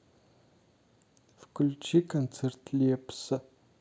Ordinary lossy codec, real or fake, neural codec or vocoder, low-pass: none; real; none; none